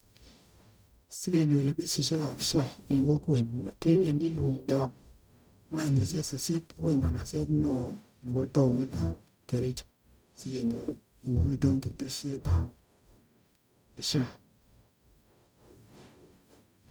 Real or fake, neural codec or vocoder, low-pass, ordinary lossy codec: fake; codec, 44.1 kHz, 0.9 kbps, DAC; none; none